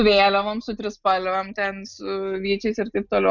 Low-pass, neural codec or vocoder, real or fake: 7.2 kHz; none; real